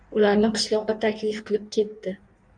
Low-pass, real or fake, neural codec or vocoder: 9.9 kHz; fake; codec, 16 kHz in and 24 kHz out, 1.1 kbps, FireRedTTS-2 codec